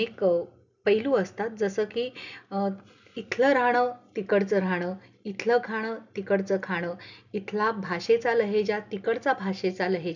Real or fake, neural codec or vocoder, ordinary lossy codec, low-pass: real; none; none; 7.2 kHz